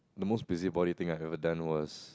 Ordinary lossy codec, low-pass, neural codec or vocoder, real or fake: none; none; none; real